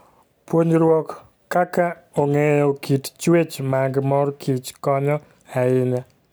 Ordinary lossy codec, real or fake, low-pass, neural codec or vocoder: none; real; none; none